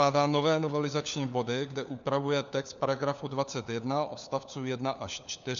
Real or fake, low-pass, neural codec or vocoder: fake; 7.2 kHz; codec, 16 kHz, 4 kbps, FunCodec, trained on LibriTTS, 50 frames a second